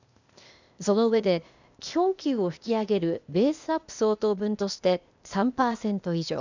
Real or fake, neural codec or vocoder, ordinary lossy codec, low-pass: fake; codec, 16 kHz, 0.8 kbps, ZipCodec; none; 7.2 kHz